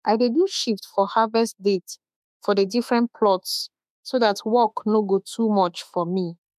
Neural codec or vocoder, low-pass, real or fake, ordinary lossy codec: autoencoder, 48 kHz, 32 numbers a frame, DAC-VAE, trained on Japanese speech; 14.4 kHz; fake; MP3, 96 kbps